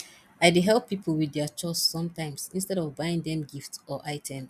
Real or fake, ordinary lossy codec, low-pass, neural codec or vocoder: real; none; 14.4 kHz; none